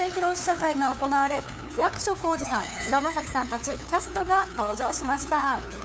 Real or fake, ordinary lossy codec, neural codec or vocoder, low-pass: fake; none; codec, 16 kHz, 2 kbps, FunCodec, trained on LibriTTS, 25 frames a second; none